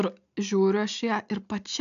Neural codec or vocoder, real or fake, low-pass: none; real; 7.2 kHz